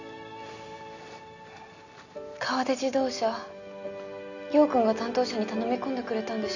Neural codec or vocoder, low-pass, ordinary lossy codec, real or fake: none; 7.2 kHz; none; real